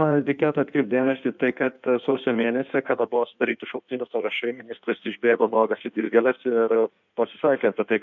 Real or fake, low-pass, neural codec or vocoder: fake; 7.2 kHz; codec, 16 kHz in and 24 kHz out, 1.1 kbps, FireRedTTS-2 codec